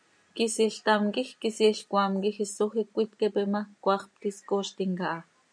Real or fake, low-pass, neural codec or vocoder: real; 9.9 kHz; none